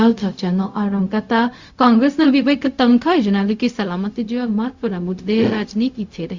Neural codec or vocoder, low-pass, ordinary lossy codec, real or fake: codec, 16 kHz, 0.4 kbps, LongCat-Audio-Codec; 7.2 kHz; Opus, 64 kbps; fake